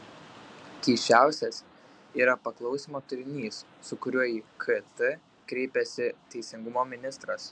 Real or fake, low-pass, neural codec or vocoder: real; 9.9 kHz; none